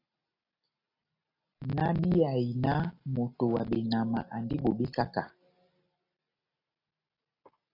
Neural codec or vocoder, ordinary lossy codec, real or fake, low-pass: none; AAC, 32 kbps; real; 5.4 kHz